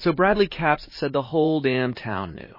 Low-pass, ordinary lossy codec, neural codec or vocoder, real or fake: 5.4 kHz; MP3, 24 kbps; vocoder, 44.1 kHz, 80 mel bands, Vocos; fake